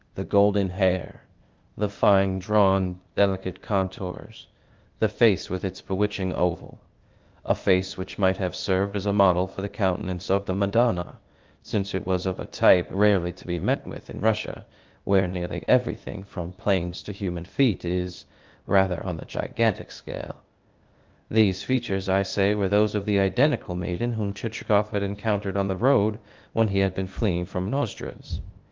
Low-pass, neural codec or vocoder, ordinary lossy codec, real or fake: 7.2 kHz; codec, 16 kHz, 0.8 kbps, ZipCodec; Opus, 32 kbps; fake